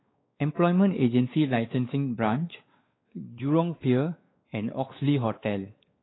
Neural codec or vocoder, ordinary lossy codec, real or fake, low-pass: codec, 16 kHz, 4 kbps, X-Codec, WavLM features, trained on Multilingual LibriSpeech; AAC, 16 kbps; fake; 7.2 kHz